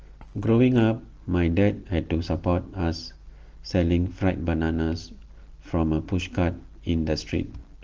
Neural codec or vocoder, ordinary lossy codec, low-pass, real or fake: none; Opus, 16 kbps; 7.2 kHz; real